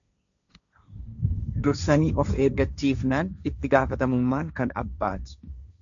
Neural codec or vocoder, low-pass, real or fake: codec, 16 kHz, 1.1 kbps, Voila-Tokenizer; 7.2 kHz; fake